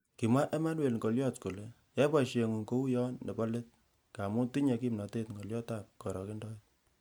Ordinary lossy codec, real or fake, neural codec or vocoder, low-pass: none; real; none; none